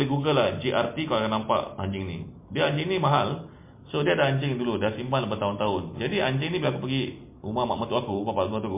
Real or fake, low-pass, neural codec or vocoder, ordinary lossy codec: real; 3.6 kHz; none; MP3, 24 kbps